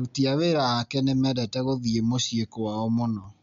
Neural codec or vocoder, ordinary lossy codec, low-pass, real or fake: none; MP3, 64 kbps; 7.2 kHz; real